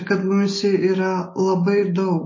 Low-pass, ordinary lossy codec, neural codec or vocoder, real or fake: 7.2 kHz; MP3, 32 kbps; none; real